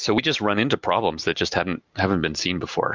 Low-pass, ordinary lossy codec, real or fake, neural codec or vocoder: 7.2 kHz; Opus, 24 kbps; real; none